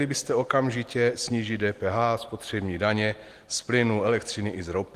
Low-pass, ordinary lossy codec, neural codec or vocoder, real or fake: 14.4 kHz; Opus, 24 kbps; none; real